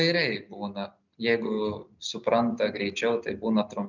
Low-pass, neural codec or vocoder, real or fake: 7.2 kHz; none; real